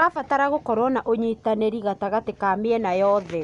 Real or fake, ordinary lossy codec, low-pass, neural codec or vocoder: real; MP3, 96 kbps; 10.8 kHz; none